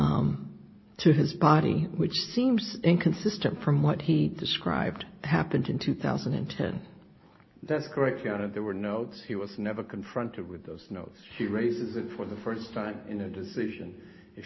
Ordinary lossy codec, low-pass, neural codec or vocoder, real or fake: MP3, 24 kbps; 7.2 kHz; none; real